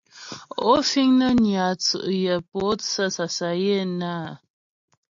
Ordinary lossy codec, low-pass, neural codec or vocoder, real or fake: AAC, 64 kbps; 7.2 kHz; none; real